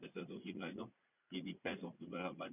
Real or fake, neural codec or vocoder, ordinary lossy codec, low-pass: fake; vocoder, 44.1 kHz, 80 mel bands, Vocos; none; 3.6 kHz